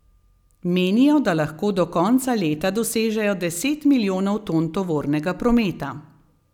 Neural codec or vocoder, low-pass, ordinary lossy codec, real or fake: none; 19.8 kHz; none; real